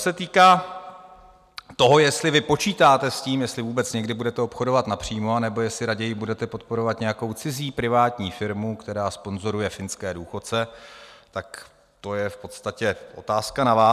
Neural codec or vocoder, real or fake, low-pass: none; real; 14.4 kHz